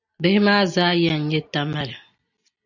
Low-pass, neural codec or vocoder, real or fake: 7.2 kHz; none; real